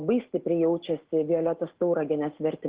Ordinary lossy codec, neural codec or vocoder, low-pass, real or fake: Opus, 16 kbps; none; 3.6 kHz; real